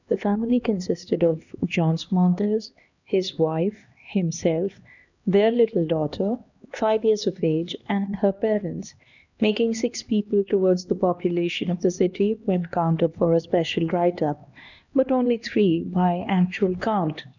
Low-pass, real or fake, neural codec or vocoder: 7.2 kHz; fake; codec, 16 kHz, 2 kbps, X-Codec, HuBERT features, trained on LibriSpeech